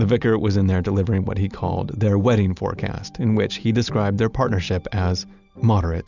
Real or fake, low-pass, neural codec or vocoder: real; 7.2 kHz; none